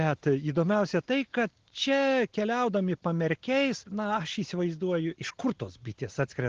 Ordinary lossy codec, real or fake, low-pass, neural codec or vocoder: Opus, 16 kbps; real; 7.2 kHz; none